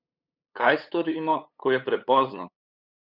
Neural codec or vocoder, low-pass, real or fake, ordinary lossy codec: codec, 16 kHz, 8 kbps, FunCodec, trained on LibriTTS, 25 frames a second; 5.4 kHz; fake; none